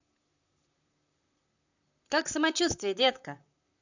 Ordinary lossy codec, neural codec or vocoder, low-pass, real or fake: none; vocoder, 44.1 kHz, 128 mel bands, Pupu-Vocoder; 7.2 kHz; fake